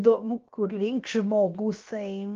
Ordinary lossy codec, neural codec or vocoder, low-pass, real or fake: Opus, 32 kbps; codec, 16 kHz, about 1 kbps, DyCAST, with the encoder's durations; 7.2 kHz; fake